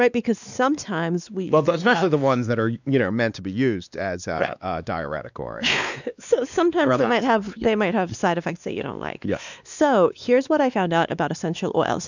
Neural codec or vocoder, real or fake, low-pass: codec, 16 kHz, 2 kbps, X-Codec, WavLM features, trained on Multilingual LibriSpeech; fake; 7.2 kHz